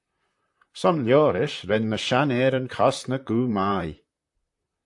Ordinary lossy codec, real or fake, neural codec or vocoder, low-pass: AAC, 64 kbps; fake; vocoder, 44.1 kHz, 128 mel bands, Pupu-Vocoder; 10.8 kHz